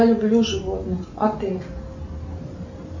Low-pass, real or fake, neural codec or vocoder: 7.2 kHz; real; none